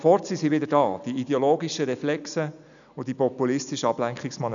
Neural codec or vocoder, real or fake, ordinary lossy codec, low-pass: none; real; none; 7.2 kHz